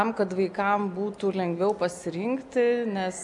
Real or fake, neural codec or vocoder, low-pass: real; none; 10.8 kHz